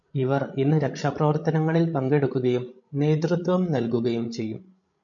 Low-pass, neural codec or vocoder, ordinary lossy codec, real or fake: 7.2 kHz; codec, 16 kHz, 16 kbps, FreqCodec, larger model; MP3, 48 kbps; fake